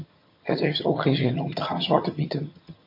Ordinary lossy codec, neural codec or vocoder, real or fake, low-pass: MP3, 32 kbps; vocoder, 22.05 kHz, 80 mel bands, HiFi-GAN; fake; 5.4 kHz